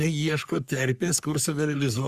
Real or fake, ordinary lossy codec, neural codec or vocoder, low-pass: fake; Opus, 64 kbps; codec, 44.1 kHz, 3.4 kbps, Pupu-Codec; 14.4 kHz